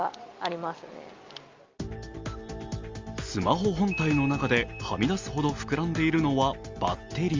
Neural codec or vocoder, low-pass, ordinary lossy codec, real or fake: none; 7.2 kHz; Opus, 32 kbps; real